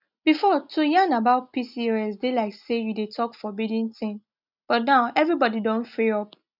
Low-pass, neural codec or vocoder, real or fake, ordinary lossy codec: 5.4 kHz; none; real; none